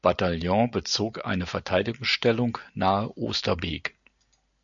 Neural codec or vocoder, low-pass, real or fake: none; 7.2 kHz; real